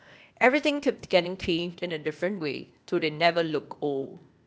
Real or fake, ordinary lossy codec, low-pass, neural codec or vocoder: fake; none; none; codec, 16 kHz, 0.8 kbps, ZipCodec